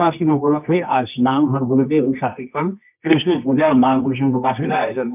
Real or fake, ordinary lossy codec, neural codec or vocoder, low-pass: fake; none; codec, 24 kHz, 0.9 kbps, WavTokenizer, medium music audio release; 3.6 kHz